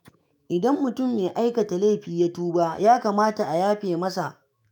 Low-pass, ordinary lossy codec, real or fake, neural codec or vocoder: none; none; fake; autoencoder, 48 kHz, 128 numbers a frame, DAC-VAE, trained on Japanese speech